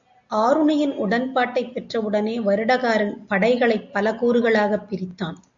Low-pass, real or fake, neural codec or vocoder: 7.2 kHz; real; none